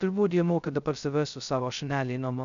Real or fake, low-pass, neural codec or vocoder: fake; 7.2 kHz; codec, 16 kHz, 0.2 kbps, FocalCodec